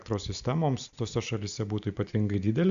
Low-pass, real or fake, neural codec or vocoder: 7.2 kHz; real; none